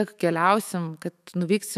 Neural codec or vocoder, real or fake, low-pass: autoencoder, 48 kHz, 128 numbers a frame, DAC-VAE, trained on Japanese speech; fake; 14.4 kHz